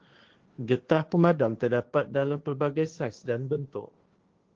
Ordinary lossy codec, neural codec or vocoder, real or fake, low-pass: Opus, 16 kbps; codec, 16 kHz, 1.1 kbps, Voila-Tokenizer; fake; 7.2 kHz